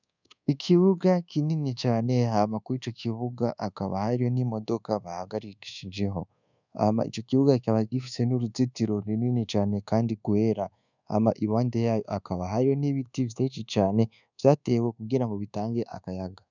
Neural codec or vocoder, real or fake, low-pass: codec, 24 kHz, 1.2 kbps, DualCodec; fake; 7.2 kHz